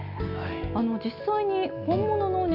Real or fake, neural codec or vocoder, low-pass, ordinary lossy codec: real; none; 5.4 kHz; none